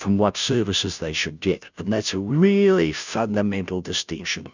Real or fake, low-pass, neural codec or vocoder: fake; 7.2 kHz; codec, 16 kHz, 0.5 kbps, FunCodec, trained on Chinese and English, 25 frames a second